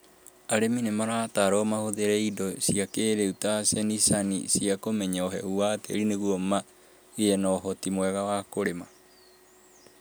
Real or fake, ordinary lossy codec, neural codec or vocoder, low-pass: real; none; none; none